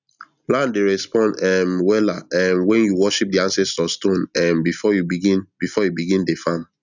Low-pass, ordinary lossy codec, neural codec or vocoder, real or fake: 7.2 kHz; none; none; real